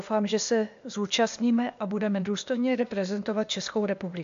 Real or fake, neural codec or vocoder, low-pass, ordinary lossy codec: fake; codec, 16 kHz, 0.8 kbps, ZipCodec; 7.2 kHz; MP3, 64 kbps